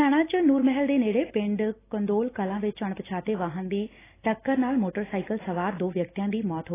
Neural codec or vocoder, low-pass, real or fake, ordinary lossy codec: none; 3.6 kHz; real; AAC, 16 kbps